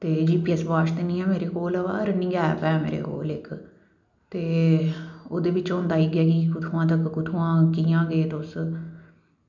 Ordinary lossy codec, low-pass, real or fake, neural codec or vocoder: none; 7.2 kHz; real; none